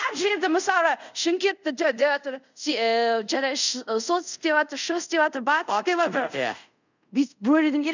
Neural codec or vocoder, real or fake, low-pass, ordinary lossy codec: codec, 24 kHz, 0.5 kbps, DualCodec; fake; 7.2 kHz; none